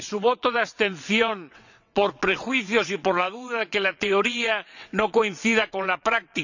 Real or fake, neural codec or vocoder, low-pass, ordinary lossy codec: fake; vocoder, 22.05 kHz, 80 mel bands, WaveNeXt; 7.2 kHz; none